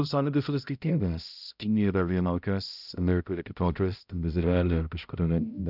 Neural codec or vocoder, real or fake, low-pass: codec, 16 kHz, 0.5 kbps, X-Codec, HuBERT features, trained on balanced general audio; fake; 5.4 kHz